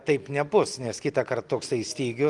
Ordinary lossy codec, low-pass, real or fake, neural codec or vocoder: Opus, 24 kbps; 10.8 kHz; real; none